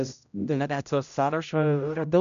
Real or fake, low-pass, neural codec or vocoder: fake; 7.2 kHz; codec, 16 kHz, 0.5 kbps, X-Codec, HuBERT features, trained on general audio